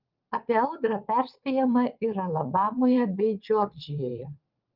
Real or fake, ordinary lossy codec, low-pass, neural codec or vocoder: fake; Opus, 24 kbps; 5.4 kHz; vocoder, 22.05 kHz, 80 mel bands, WaveNeXt